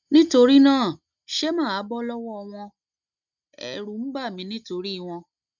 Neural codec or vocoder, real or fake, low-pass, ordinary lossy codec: none; real; 7.2 kHz; none